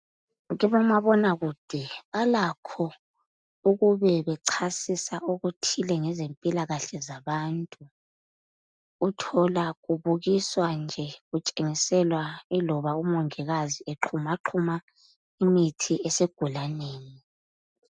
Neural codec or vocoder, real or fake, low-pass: none; real; 9.9 kHz